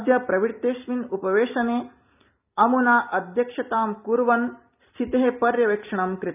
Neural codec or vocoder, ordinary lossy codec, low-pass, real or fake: none; none; 3.6 kHz; real